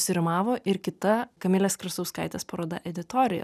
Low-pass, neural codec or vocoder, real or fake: 14.4 kHz; none; real